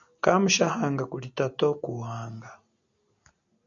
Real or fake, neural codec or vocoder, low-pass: real; none; 7.2 kHz